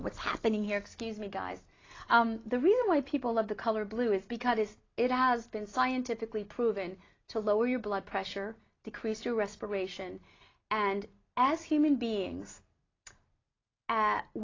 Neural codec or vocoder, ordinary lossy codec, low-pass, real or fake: none; AAC, 32 kbps; 7.2 kHz; real